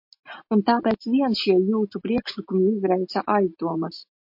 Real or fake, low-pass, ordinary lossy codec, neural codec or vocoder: real; 5.4 kHz; MP3, 32 kbps; none